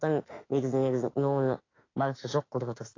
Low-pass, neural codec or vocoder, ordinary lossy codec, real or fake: 7.2 kHz; autoencoder, 48 kHz, 32 numbers a frame, DAC-VAE, trained on Japanese speech; AAC, 32 kbps; fake